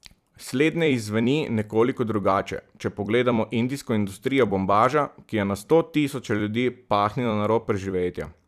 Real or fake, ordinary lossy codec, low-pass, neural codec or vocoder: fake; none; 14.4 kHz; vocoder, 44.1 kHz, 128 mel bands every 256 samples, BigVGAN v2